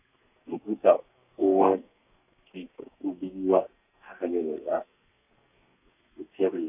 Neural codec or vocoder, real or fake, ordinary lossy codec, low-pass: codec, 32 kHz, 1.9 kbps, SNAC; fake; none; 3.6 kHz